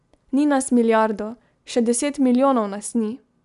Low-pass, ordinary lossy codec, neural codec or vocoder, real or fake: 10.8 kHz; none; none; real